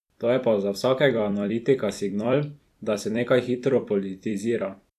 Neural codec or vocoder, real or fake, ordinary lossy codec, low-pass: vocoder, 44.1 kHz, 128 mel bands every 256 samples, BigVGAN v2; fake; none; 14.4 kHz